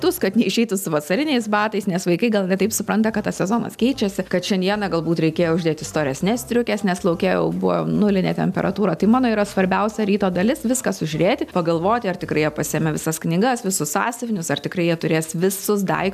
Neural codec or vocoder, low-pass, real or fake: none; 14.4 kHz; real